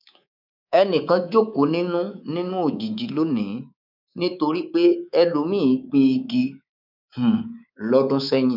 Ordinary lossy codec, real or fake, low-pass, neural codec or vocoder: none; fake; 5.4 kHz; codec, 24 kHz, 3.1 kbps, DualCodec